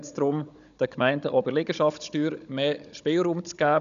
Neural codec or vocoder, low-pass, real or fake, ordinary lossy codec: codec, 16 kHz, 16 kbps, FunCodec, trained on Chinese and English, 50 frames a second; 7.2 kHz; fake; none